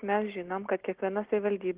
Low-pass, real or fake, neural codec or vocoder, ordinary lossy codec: 3.6 kHz; real; none; Opus, 16 kbps